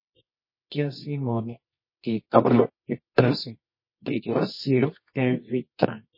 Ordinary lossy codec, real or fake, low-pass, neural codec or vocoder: MP3, 24 kbps; fake; 5.4 kHz; codec, 24 kHz, 0.9 kbps, WavTokenizer, medium music audio release